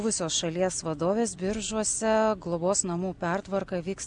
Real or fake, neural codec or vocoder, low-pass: real; none; 9.9 kHz